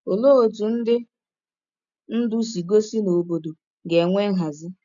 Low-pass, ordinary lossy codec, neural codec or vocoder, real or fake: 7.2 kHz; MP3, 96 kbps; none; real